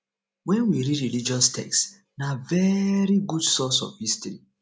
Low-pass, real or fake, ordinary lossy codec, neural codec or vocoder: none; real; none; none